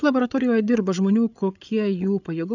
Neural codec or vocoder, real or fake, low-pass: codec, 16 kHz, 8 kbps, FreqCodec, larger model; fake; 7.2 kHz